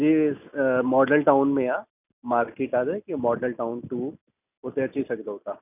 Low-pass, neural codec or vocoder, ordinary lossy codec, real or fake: 3.6 kHz; none; none; real